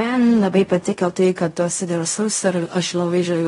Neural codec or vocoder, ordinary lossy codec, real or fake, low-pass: codec, 16 kHz in and 24 kHz out, 0.4 kbps, LongCat-Audio-Codec, fine tuned four codebook decoder; AAC, 32 kbps; fake; 10.8 kHz